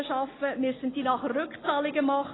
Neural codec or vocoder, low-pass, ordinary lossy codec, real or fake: none; 7.2 kHz; AAC, 16 kbps; real